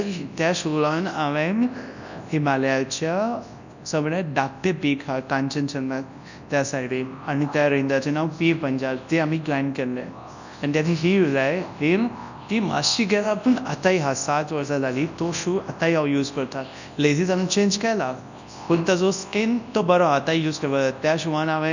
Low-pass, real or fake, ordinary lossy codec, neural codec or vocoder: 7.2 kHz; fake; none; codec, 24 kHz, 0.9 kbps, WavTokenizer, large speech release